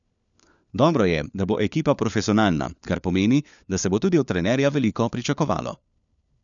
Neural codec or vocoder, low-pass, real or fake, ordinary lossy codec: codec, 16 kHz, 4 kbps, FunCodec, trained on LibriTTS, 50 frames a second; 7.2 kHz; fake; none